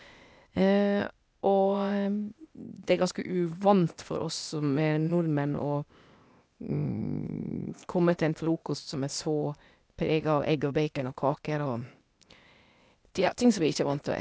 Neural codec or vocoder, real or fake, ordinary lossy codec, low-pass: codec, 16 kHz, 0.8 kbps, ZipCodec; fake; none; none